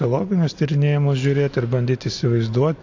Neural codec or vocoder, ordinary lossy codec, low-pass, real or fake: none; AAC, 48 kbps; 7.2 kHz; real